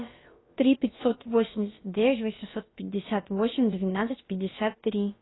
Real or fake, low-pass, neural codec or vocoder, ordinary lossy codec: fake; 7.2 kHz; codec, 16 kHz, about 1 kbps, DyCAST, with the encoder's durations; AAC, 16 kbps